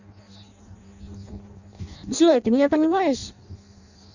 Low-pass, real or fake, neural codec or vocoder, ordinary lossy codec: 7.2 kHz; fake; codec, 16 kHz in and 24 kHz out, 0.6 kbps, FireRedTTS-2 codec; none